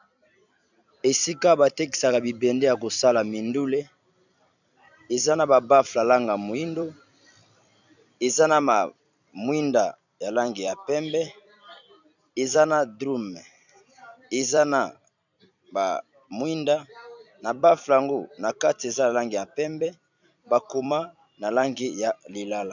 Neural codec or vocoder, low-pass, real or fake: vocoder, 44.1 kHz, 128 mel bands every 256 samples, BigVGAN v2; 7.2 kHz; fake